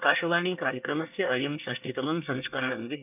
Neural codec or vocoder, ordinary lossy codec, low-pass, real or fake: codec, 24 kHz, 1 kbps, SNAC; none; 3.6 kHz; fake